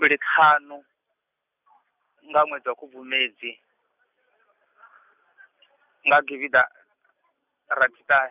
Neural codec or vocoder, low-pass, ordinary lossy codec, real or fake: none; 3.6 kHz; none; real